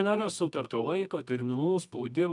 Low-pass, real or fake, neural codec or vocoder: 10.8 kHz; fake; codec, 24 kHz, 0.9 kbps, WavTokenizer, medium music audio release